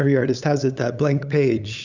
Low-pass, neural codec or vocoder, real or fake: 7.2 kHz; codec, 16 kHz, 8 kbps, FunCodec, trained on LibriTTS, 25 frames a second; fake